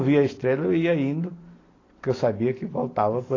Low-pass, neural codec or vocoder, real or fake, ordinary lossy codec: 7.2 kHz; none; real; AAC, 32 kbps